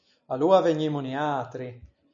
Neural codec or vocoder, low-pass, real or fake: none; 7.2 kHz; real